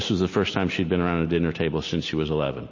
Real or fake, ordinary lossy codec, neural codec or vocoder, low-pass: real; MP3, 32 kbps; none; 7.2 kHz